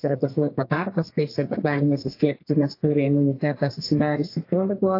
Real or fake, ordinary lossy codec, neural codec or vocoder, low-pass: fake; AAC, 32 kbps; codec, 32 kHz, 1.9 kbps, SNAC; 5.4 kHz